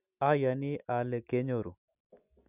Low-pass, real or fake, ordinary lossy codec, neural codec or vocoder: 3.6 kHz; real; none; none